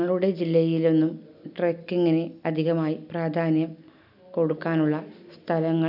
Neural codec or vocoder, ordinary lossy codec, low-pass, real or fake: none; none; 5.4 kHz; real